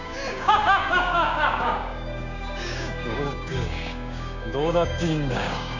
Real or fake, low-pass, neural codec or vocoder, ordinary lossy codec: fake; 7.2 kHz; codec, 16 kHz, 6 kbps, DAC; none